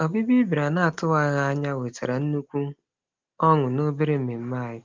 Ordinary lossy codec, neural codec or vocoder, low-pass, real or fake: Opus, 32 kbps; none; 7.2 kHz; real